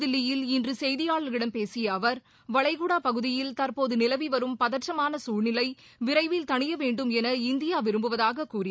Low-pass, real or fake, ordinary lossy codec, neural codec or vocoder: none; real; none; none